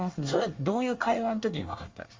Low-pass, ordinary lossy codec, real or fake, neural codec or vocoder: 7.2 kHz; Opus, 32 kbps; fake; codec, 24 kHz, 1 kbps, SNAC